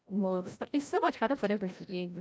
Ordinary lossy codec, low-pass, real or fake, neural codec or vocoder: none; none; fake; codec, 16 kHz, 0.5 kbps, FreqCodec, larger model